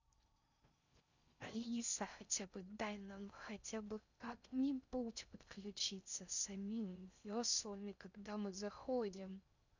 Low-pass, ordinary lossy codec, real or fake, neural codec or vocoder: 7.2 kHz; none; fake; codec, 16 kHz in and 24 kHz out, 0.6 kbps, FocalCodec, streaming, 4096 codes